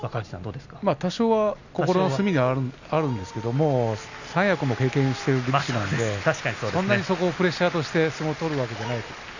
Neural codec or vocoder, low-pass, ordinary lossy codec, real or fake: none; 7.2 kHz; none; real